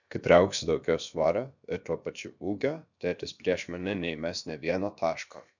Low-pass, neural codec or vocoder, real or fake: 7.2 kHz; codec, 16 kHz, about 1 kbps, DyCAST, with the encoder's durations; fake